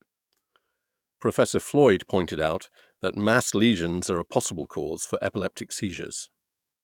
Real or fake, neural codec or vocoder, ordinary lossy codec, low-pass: fake; codec, 44.1 kHz, 7.8 kbps, DAC; none; 19.8 kHz